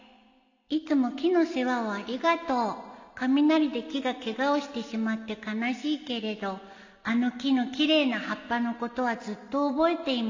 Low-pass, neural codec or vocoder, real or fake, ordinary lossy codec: 7.2 kHz; none; real; AAC, 48 kbps